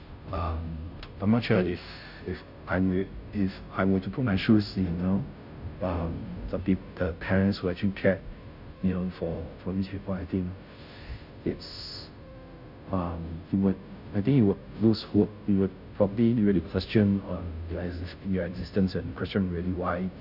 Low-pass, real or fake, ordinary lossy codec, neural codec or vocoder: 5.4 kHz; fake; none; codec, 16 kHz, 0.5 kbps, FunCodec, trained on Chinese and English, 25 frames a second